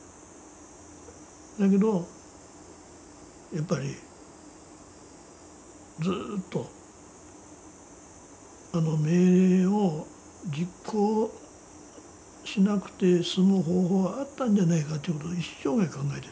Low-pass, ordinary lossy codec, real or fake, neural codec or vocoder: none; none; real; none